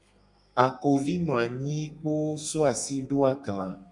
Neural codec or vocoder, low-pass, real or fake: codec, 32 kHz, 1.9 kbps, SNAC; 10.8 kHz; fake